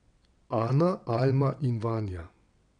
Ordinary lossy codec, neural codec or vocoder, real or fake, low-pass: none; vocoder, 22.05 kHz, 80 mel bands, WaveNeXt; fake; 9.9 kHz